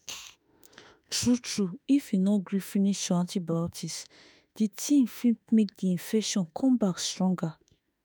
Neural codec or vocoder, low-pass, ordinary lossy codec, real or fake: autoencoder, 48 kHz, 32 numbers a frame, DAC-VAE, trained on Japanese speech; none; none; fake